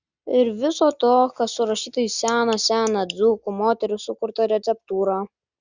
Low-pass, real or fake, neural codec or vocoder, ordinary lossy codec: 7.2 kHz; real; none; Opus, 64 kbps